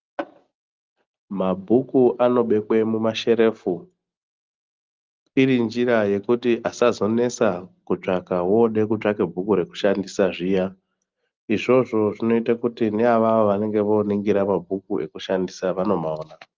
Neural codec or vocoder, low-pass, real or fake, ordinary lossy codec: none; 7.2 kHz; real; Opus, 24 kbps